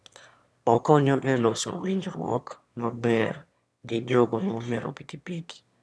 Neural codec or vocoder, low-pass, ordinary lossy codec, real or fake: autoencoder, 22.05 kHz, a latent of 192 numbers a frame, VITS, trained on one speaker; none; none; fake